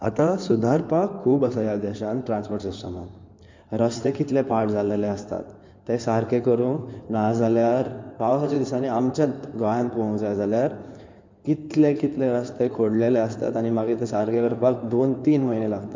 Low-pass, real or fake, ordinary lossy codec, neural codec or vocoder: 7.2 kHz; fake; MP3, 64 kbps; codec, 16 kHz in and 24 kHz out, 2.2 kbps, FireRedTTS-2 codec